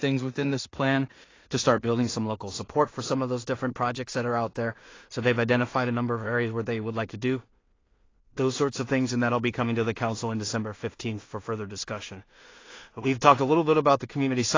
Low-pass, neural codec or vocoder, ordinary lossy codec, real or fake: 7.2 kHz; codec, 16 kHz in and 24 kHz out, 0.4 kbps, LongCat-Audio-Codec, two codebook decoder; AAC, 32 kbps; fake